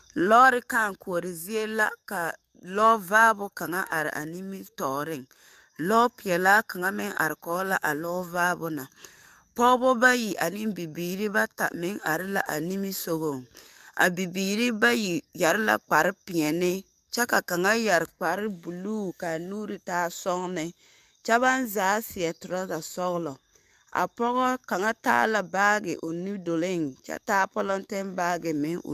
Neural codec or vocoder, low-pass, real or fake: codec, 44.1 kHz, 7.8 kbps, DAC; 14.4 kHz; fake